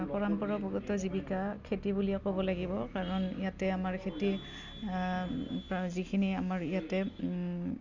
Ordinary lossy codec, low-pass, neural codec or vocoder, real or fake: none; 7.2 kHz; none; real